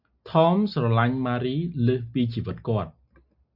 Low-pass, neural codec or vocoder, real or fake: 5.4 kHz; none; real